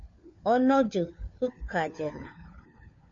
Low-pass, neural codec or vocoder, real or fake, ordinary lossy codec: 7.2 kHz; codec, 16 kHz, 4 kbps, FunCodec, trained on LibriTTS, 50 frames a second; fake; MP3, 48 kbps